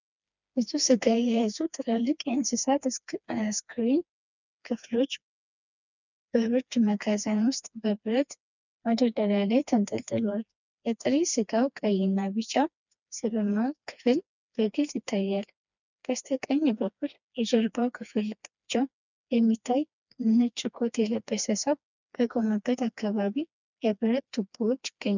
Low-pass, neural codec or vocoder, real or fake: 7.2 kHz; codec, 16 kHz, 2 kbps, FreqCodec, smaller model; fake